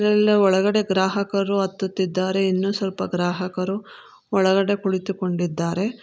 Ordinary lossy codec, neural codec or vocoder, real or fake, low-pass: none; none; real; none